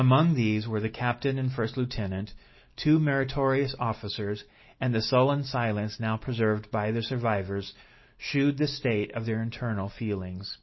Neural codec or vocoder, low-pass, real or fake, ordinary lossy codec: none; 7.2 kHz; real; MP3, 24 kbps